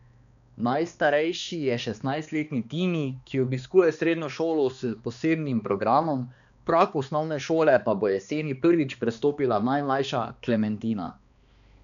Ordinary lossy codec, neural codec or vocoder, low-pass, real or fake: none; codec, 16 kHz, 2 kbps, X-Codec, HuBERT features, trained on balanced general audio; 7.2 kHz; fake